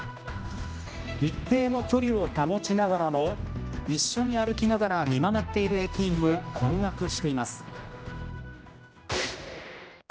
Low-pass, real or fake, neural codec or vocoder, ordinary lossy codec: none; fake; codec, 16 kHz, 1 kbps, X-Codec, HuBERT features, trained on general audio; none